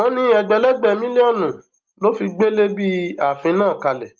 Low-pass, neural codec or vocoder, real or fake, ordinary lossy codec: 7.2 kHz; none; real; Opus, 32 kbps